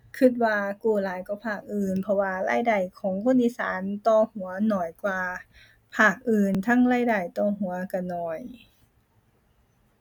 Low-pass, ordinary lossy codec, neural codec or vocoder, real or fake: 19.8 kHz; none; none; real